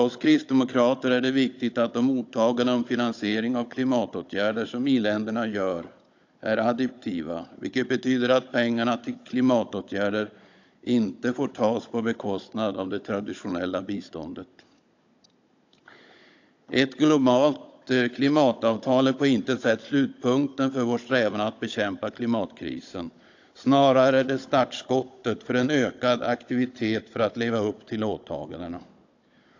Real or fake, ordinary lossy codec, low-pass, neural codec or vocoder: fake; AAC, 48 kbps; 7.2 kHz; codec, 16 kHz, 16 kbps, FunCodec, trained on Chinese and English, 50 frames a second